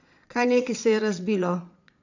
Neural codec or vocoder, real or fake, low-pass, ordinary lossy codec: vocoder, 22.05 kHz, 80 mel bands, Vocos; fake; 7.2 kHz; none